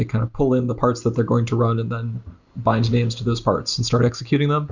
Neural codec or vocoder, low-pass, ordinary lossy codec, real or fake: none; 7.2 kHz; Opus, 64 kbps; real